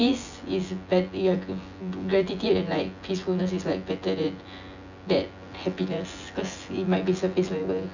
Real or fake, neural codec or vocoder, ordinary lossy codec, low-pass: fake; vocoder, 24 kHz, 100 mel bands, Vocos; none; 7.2 kHz